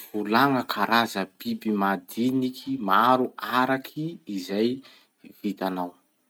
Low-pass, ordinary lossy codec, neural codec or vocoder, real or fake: none; none; none; real